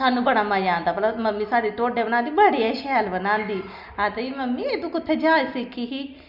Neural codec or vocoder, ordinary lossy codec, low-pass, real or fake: none; none; 5.4 kHz; real